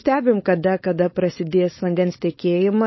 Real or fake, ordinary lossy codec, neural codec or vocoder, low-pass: fake; MP3, 24 kbps; codec, 16 kHz, 4.8 kbps, FACodec; 7.2 kHz